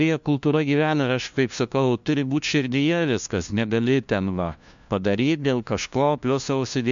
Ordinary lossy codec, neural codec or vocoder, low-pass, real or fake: MP3, 64 kbps; codec, 16 kHz, 1 kbps, FunCodec, trained on LibriTTS, 50 frames a second; 7.2 kHz; fake